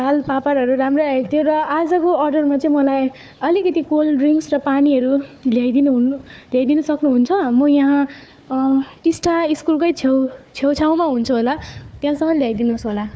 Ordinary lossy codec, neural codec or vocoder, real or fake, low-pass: none; codec, 16 kHz, 4 kbps, FunCodec, trained on Chinese and English, 50 frames a second; fake; none